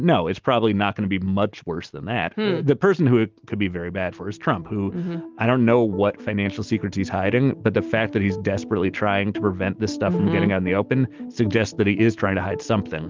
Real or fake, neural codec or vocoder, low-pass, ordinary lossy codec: real; none; 7.2 kHz; Opus, 24 kbps